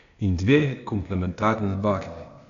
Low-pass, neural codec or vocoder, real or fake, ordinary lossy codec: 7.2 kHz; codec, 16 kHz, 0.8 kbps, ZipCodec; fake; none